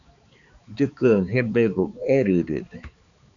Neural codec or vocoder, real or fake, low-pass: codec, 16 kHz, 4 kbps, X-Codec, HuBERT features, trained on balanced general audio; fake; 7.2 kHz